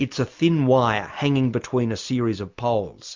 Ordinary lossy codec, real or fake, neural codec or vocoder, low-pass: MP3, 64 kbps; real; none; 7.2 kHz